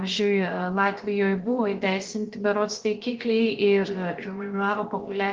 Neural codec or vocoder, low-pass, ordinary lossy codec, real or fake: codec, 16 kHz, about 1 kbps, DyCAST, with the encoder's durations; 7.2 kHz; Opus, 16 kbps; fake